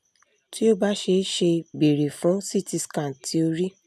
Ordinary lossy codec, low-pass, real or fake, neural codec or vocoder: none; 14.4 kHz; real; none